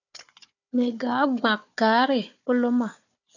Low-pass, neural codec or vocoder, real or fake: 7.2 kHz; codec, 16 kHz, 4 kbps, FunCodec, trained on Chinese and English, 50 frames a second; fake